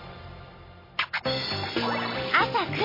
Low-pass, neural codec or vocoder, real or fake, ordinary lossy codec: 5.4 kHz; none; real; MP3, 24 kbps